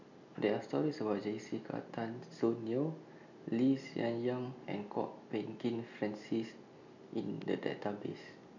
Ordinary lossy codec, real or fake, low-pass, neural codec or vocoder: none; real; 7.2 kHz; none